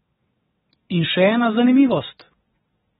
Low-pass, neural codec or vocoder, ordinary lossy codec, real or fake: 9.9 kHz; vocoder, 22.05 kHz, 80 mel bands, WaveNeXt; AAC, 16 kbps; fake